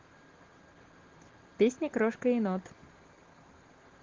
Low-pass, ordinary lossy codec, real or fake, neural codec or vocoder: 7.2 kHz; Opus, 16 kbps; real; none